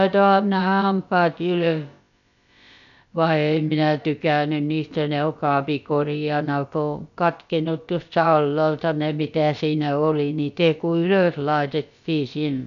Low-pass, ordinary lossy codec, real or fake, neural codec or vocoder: 7.2 kHz; none; fake; codec, 16 kHz, about 1 kbps, DyCAST, with the encoder's durations